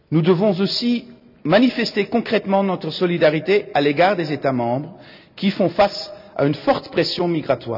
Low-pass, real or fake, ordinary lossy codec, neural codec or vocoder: 5.4 kHz; real; none; none